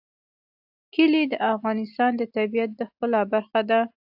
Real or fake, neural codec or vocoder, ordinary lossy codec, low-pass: real; none; AAC, 48 kbps; 5.4 kHz